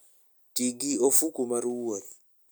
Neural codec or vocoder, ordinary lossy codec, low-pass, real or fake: none; none; none; real